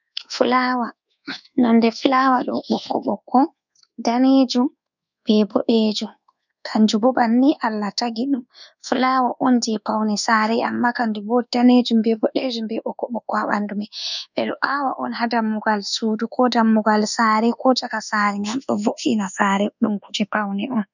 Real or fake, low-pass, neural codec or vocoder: fake; 7.2 kHz; codec, 24 kHz, 1.2 kbps, DualCodec